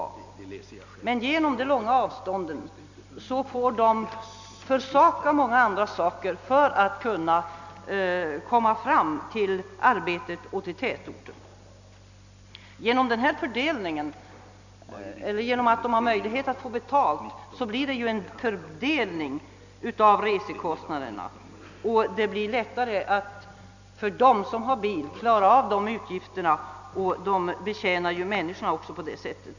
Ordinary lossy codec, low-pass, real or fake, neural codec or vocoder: none; 7.2 kHz; real; none